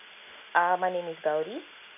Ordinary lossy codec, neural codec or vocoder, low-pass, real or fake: none; none; 3.6 kHz; real